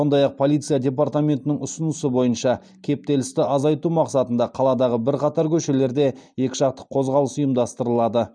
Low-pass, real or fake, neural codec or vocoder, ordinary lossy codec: 9.9 kHz; real; none; none